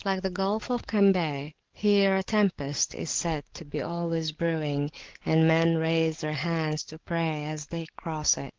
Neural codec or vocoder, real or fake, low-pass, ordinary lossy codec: codec, 44.1 kHz, 7.8 kbps, DAC; fake; 7.2 kHz; Opus, 16 kbps